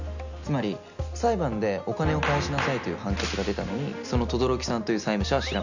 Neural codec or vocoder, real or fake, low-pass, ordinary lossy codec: none; real; 7.2 kHz; none